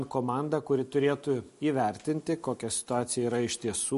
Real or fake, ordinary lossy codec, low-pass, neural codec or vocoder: real; MP3, 48 kbps; 14.4 kHz; none